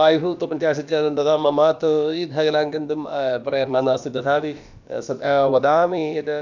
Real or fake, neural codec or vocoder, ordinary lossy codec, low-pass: fake; codec, 16 kHz, about 1 kbps, DyCAST, with the encoder's durations; none; 7.2 kHz